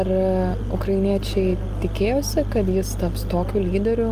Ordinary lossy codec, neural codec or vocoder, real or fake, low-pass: Opus, 32 kbps; none; real; 14.4 kHz